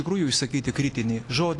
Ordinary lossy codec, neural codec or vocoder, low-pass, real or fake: AAC, 48 kbps; none; 10.8 kHz; real